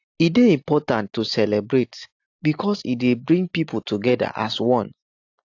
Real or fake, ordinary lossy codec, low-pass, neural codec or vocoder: real; AAC, 48 kbps; 7.2 kHz; none